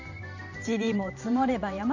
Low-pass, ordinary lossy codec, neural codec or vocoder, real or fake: 7.2 kHz; none; vocoder, 44.1 kHz, 128 mel bands every 512 samples, BigVGAN v2; fake